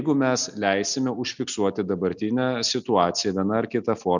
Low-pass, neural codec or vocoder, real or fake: 7.2 kHz; none; real